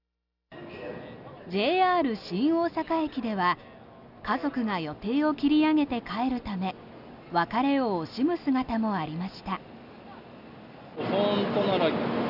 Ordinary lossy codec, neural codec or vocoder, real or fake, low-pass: none; none; real; 5.4 kHz